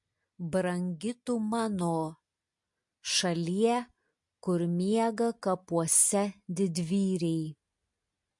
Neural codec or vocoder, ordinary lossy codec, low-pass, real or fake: none; MP3, 48 kbps; 10.8 kHz; real